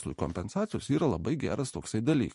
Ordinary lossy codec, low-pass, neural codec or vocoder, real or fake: MP3, 48 kbps; 14.4 kHz; none; real